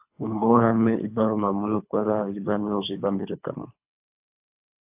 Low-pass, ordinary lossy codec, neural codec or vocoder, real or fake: 3.6 kHz; AAC, 32 kbps; codec, 24 kHz, 3 kbps, HILCodec; fake